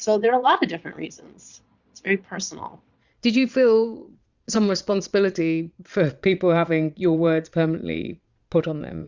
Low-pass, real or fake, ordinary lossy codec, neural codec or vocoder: 7.2 kHz; fake; Opus, 64 kbps; vocoder, 22.05 kHz, 80 mel bands, Vocos